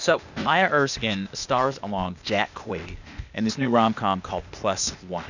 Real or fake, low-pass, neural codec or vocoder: fake; 7.2 kHz; codec, 16 kHz, 0.8 kbps, ZipCodec